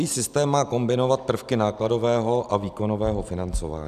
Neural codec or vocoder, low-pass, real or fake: none; 14.4 kHz; real